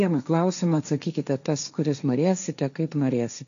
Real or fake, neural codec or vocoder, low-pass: fake; codec, 16 kHz, 1.1 kbps, Voila-Tokenizer; 7.2 kHz